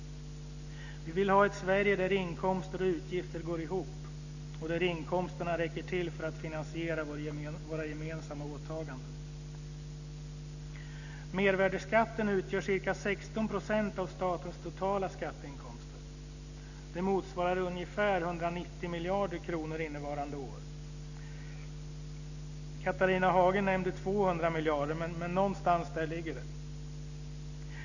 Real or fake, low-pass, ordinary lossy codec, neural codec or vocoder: real; 7.2 kHz; none; none